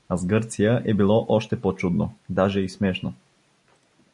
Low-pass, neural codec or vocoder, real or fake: 10.8 kHz; none; real